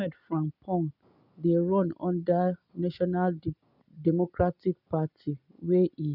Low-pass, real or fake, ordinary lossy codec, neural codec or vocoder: 5.4 kHz; real; none; none